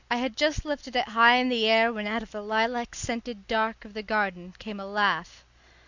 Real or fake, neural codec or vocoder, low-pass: real; none; 7.2 kHz